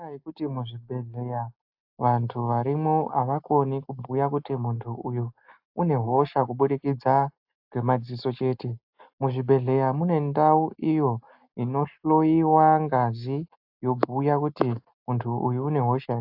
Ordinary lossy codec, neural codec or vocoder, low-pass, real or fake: AAC, 48 kbps; none; 5.4 kHz; real